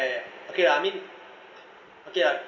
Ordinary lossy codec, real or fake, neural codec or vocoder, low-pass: none; real; none; 7.2 kHz